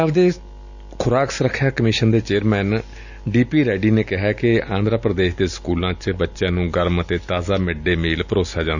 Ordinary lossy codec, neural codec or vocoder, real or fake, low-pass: none; none; real; 7.2 kHz